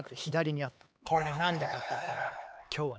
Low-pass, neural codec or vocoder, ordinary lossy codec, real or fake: none; codec, 16 kHz, 4 kbps, X-Codec, HuBERT features, trained on LibriSpeech; none; fake